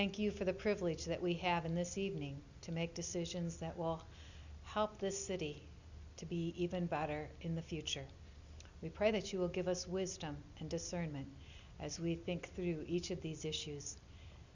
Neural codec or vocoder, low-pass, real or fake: none; 7.2 kHz; real